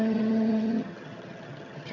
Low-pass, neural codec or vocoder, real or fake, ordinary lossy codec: 7.2 kHz; vocoder, 22.05 kHz, 80 mel bands, HiFi-GAN; fake; none